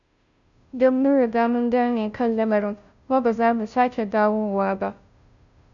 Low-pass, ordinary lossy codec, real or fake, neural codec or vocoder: 7.2 kHz; none; fake; codec, 16 kHz, 0.5 kbps, FunCodec, trained on Chinese and English, 25 frames a second